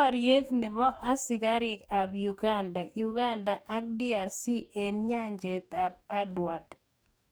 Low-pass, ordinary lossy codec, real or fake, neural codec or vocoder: none; none; fake; codec, 44.1 kHz, 2.6 kbps, DAC